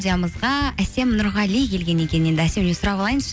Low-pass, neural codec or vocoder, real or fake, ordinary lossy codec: none; none; real; none